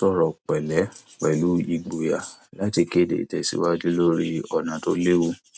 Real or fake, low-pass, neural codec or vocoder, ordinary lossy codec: real; none; none; none